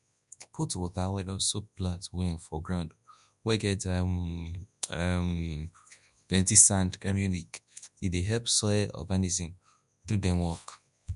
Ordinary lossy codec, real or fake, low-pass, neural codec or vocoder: none; fake; 10.8 kHz; codec, 24 kHz, 0.9 kbps, WavTokenizer, large speech release